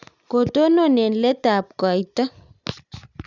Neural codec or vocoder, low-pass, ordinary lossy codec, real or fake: none; 7.2 kHz; none; real